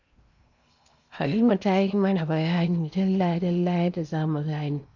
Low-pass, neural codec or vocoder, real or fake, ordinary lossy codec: 7.2 kHz; codec, 16 kHz in and 24 kHz out, 0.8 kbps, FocalCodec, streaming, 65536 codes; fake; none